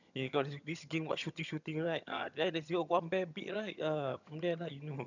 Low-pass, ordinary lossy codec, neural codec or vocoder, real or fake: 7.2 kHz; none; vocoder, 22.05 kHz, 80 mel bands, HiFi-GAN; fake